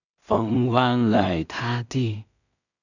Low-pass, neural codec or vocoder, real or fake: 7.2 kHz; codec, 16 kHz in and 24 kHz out, 0.4 kbps, LongCat-Audio-Codec, two codebook decoder; fake